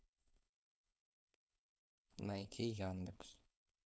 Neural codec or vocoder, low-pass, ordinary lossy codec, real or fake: codec, 16 kHz, 4.8 kbps, FACodec; none; none; fake